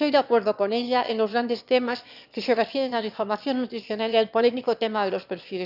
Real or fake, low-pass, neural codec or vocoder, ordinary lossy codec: fake; 5.4 kHz; autoencoder, 22.05 kHz, a latent of 192 numbers a frame, VITS, trained on one speaker; none